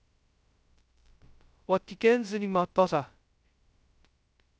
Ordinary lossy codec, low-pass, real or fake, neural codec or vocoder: none; none; fake; codec, 16 kHz, 0.2 kbps, FocalCodec